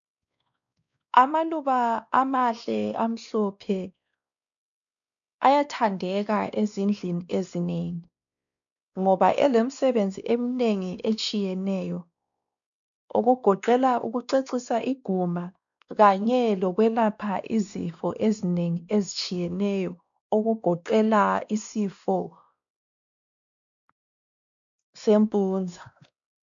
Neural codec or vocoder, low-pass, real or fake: codec, 16 kHz, 2 kbps, X-Codec, WavLM features, trained on Multilingual LibriSpeech; 7.2 kHz; fake